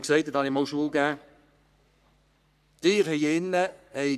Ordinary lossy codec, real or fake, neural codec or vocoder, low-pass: none; fake; codec, 44.1 kHz, 3.4 kbps, Pupu-Codec; 14.4 kHz